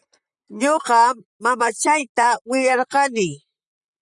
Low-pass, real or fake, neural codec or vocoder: 10.8 kHz; fake; vocoder, 44.1 kHz, 128 mel bands, Pupu-Vocoder